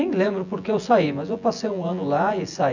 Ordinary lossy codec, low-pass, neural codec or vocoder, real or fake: none; 7.2 kHz; vocoder, 24 kHz, 100 mel bands, Vocos; fake